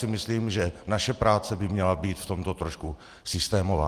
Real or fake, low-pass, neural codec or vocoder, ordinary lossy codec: real; 14.4 kHz; none; Opus, 24 kbps